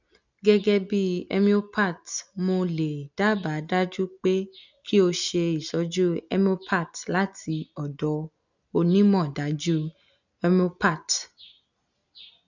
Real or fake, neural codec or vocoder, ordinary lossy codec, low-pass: real; none; none; 7.2 kHz